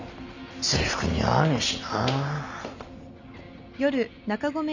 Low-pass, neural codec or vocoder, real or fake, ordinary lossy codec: 7.2 kHz; none; real; none